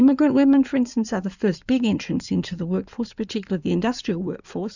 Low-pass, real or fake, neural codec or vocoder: 7.2 kHz; fake; codec, 16 kHz in and 24 kHz out, 2.2 kbps, FireRedTTS-2 codec